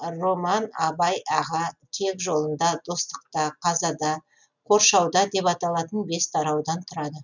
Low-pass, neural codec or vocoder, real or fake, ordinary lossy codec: 7.2 kHz; none; real; none